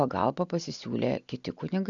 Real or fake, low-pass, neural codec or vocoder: real; 7.2 kHz; none